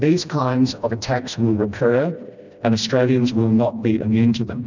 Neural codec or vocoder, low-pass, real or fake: codec, 16 kHz, 1 kbps, FreqCodec, smaller model; 7.2 kHz; fake